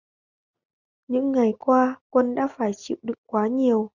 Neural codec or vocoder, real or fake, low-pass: none; real; 7.2 kHz